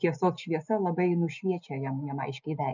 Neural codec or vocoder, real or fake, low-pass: none; real; 7.2 kHz